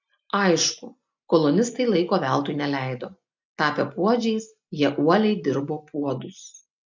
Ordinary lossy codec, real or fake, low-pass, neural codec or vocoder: MP3, 64 kbps; real; 7.2 kHz; none